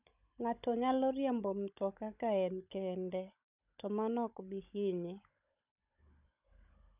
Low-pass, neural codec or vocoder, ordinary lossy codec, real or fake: 3.6 kHz; codec, 16 kHz, 16 kbps, FunCodec, trained on Chinese and English, 50 frames a second; none; fake